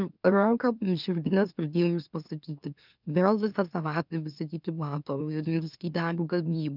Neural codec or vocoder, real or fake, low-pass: autoencoder, 44.1 kHz, a latent of 192 numbers a frame, MeloTTS; fake; 5.4 kHz